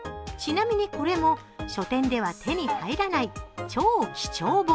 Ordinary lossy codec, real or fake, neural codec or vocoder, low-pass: none; real; none; none